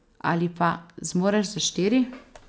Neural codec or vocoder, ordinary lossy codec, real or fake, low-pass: none; none; real; none